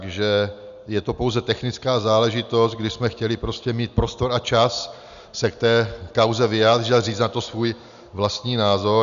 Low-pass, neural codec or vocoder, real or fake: 7.2 kHz; none; real